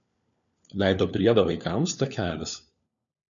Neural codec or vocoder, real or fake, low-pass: codec, 16 kHz, 4 kbps, FunCodec, trained on LibriTTS, 50 frames a second; fake; 7.2 kHz